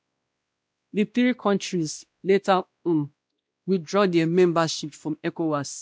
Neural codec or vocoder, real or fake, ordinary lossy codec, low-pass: codec, 16 kHz, 1 kbps, X-Codec, WavLM features, trained on Multilingual LibriSpeech; fake; none; none